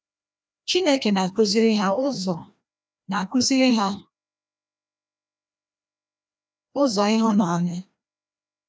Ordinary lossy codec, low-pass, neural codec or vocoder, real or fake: none; none; codec, 16 kHz, 1 kbps, FreqCodec, larger model; fake